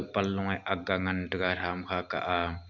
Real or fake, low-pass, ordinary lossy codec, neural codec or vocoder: real; 7.2 kHz; none; none